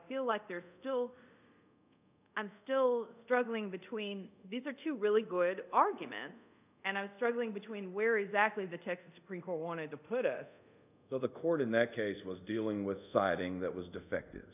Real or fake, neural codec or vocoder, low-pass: fake; codec, 24 kHz, 0.5 kbps, DualCodec; 3.6 kHz